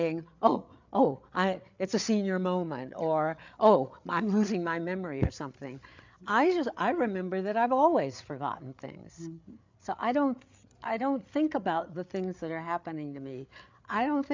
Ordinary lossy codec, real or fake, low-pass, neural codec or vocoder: MP3, 64 kbps; fake; 7.2 kHz; codec, 16 kHz, 8 kbps, FreqCodec, larger model